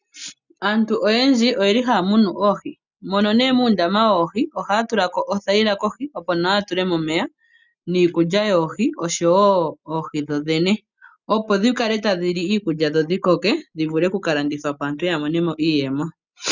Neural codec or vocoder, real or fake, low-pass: none; real; 7.2 kHz